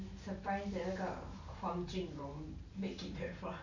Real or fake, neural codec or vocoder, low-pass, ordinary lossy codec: fake; vocoder, 44.1 kHz, 128 mel bands every 512 samples, BigVGAN v2; 7.2 kHz; none